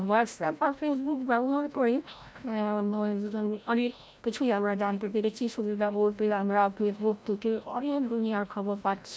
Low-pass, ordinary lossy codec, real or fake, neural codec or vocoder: none; none; fake; codec, 16 kHz, 0.5 kbps, FreqCodec, larger model